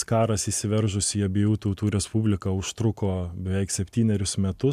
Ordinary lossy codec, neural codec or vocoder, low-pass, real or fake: MP3, 96 kbps; none; 14.4 kHz; real